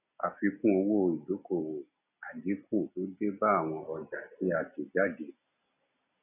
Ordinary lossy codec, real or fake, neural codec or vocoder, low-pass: none; real; none; 3.6 kHz